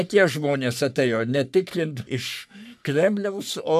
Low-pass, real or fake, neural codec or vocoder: 14.4 kHz; fake; codec, 44.1 kHz, 3.4 kbps, Pupu-Codec